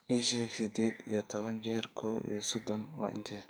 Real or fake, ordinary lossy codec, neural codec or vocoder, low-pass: fake; none; codec, 44.1 kHz, 2.6 kbps, SNAC; none